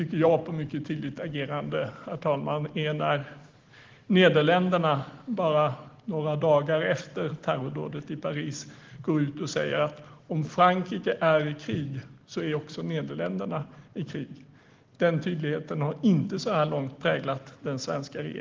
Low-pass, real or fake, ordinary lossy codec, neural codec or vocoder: 7.2 kHz; real; Opus, 16 kbps; none